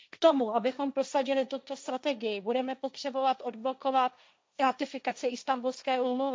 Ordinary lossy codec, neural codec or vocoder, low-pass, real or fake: none; codec, 16 kHz, 1.1 kbps, Voila-Tokenizer; none; fake